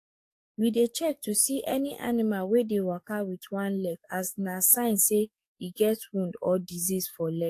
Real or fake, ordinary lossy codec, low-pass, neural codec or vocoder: fake; AAC, 64 kbps; 14.4 kHz; codec, 44.1 kHz, 7.8 kbps, DAC